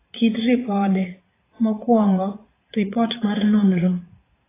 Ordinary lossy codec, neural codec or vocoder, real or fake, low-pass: AAC, 16 kbps; vocoder, 44.1 kHz, 80 mel bands, Vocos; fake; 3.6 kHz